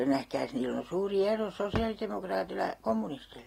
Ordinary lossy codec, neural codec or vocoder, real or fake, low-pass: AAC, 48 kbps; none; real; 19.8 kHz